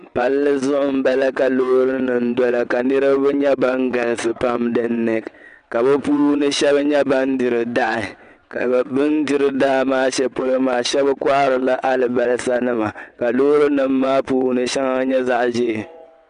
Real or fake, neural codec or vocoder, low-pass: fake; vocoder, 22.05 kHz, 80 mel bands, WaveNeXt; 9.9 kHz